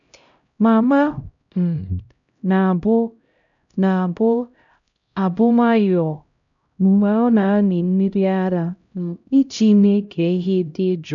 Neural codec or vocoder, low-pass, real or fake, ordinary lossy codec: codec, 16 kHz, 0.5 kbps, X-Codec, HuBERT features, trained on LibriSpeech; 7.2 kHz; fake; none